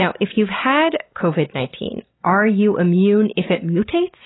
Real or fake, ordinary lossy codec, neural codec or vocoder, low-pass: real; AAC, 16 kbps; none; 7.2 kHz